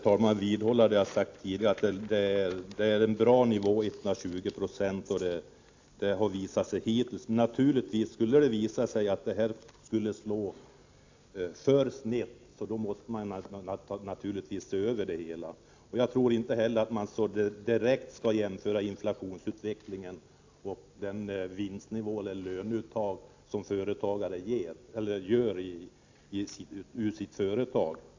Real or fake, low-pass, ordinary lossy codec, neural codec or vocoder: real; 7.2 kHz; AAC, 48 kbps; none